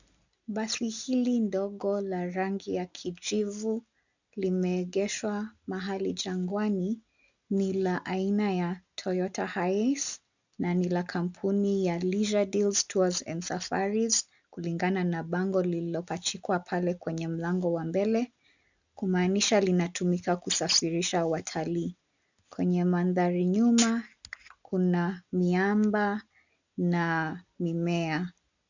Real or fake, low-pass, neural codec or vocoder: real; 7.2 kHz; none